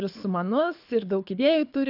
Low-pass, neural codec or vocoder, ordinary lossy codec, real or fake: 5.4 kHz; codec, 24 kHz, 6 kbps, HILCodec; AAC, 48 kbps; fake